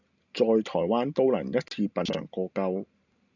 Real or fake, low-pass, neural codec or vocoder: real; 7.2 kHz; none